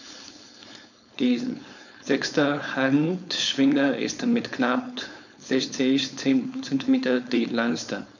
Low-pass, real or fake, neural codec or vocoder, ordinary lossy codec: 7.2 kHz; fake; codec, 16 kHz, 4.8 kbps, FACodec; none